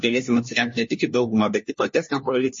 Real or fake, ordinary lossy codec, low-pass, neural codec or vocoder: fake; MP3, 32 kbps; 7.2 kHz; codec, 16 kHz, 1 kbps, FunCodec, trained on LibriTTS, 50 frames a second